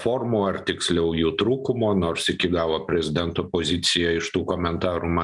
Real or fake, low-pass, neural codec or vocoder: real; 10.8 kHz; none